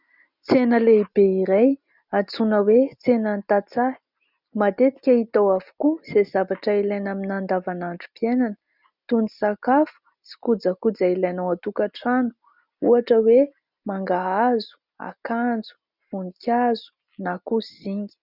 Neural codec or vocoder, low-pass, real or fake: none; 5.4 kHz; real